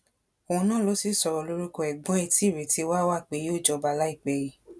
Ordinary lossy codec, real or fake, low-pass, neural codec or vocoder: none; real; none; none